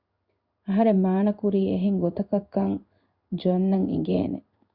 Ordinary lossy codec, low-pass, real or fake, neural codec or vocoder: Opus, 64 kbps; 5.4 kHz; fake; codec, 16 kHz in and 24 kHz out, 1 kbps, XY-Tokenizer